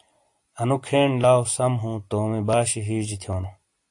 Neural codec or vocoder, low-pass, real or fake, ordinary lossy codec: none; 10.8 kHz; real; AAC, 64 kbps